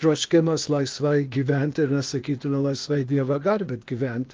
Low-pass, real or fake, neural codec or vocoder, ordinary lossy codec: 7.2 kHz; fake; codec, 16 kHz, 0.8 kbps, ZipCodec; Opus, 24 kbps